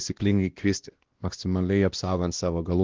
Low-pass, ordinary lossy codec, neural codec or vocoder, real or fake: 7.2 kHz; Opus, 16 kbps; codec, 16 kHz, 1 kbps, X-Codec, WavLM features, trained on Multilingual LibriSpeech; fake